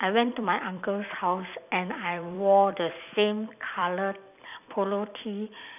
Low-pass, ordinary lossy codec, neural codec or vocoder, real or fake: 3.6 kHz; none; vocoder, 44.1 kHz, 128 mel bands every 256 samples, BigVGAN v2; fake